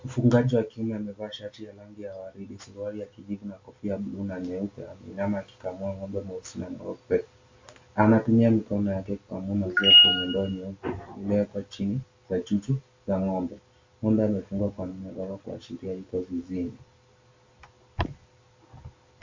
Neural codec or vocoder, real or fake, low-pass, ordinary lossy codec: none; real; 7.2 kHz; MP3, 64 kbps